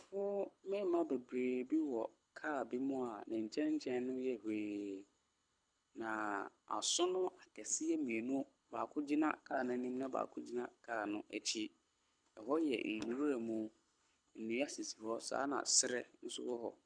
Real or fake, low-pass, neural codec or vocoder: fake; 9.9 kHz; codec, 24 kHz, 6 kbps, HILCodec